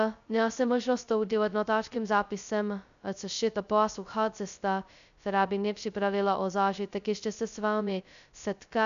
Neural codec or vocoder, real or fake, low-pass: codec, 16 kHz, 0.2 kbps, FocalCodec; fake; 7.2 kHz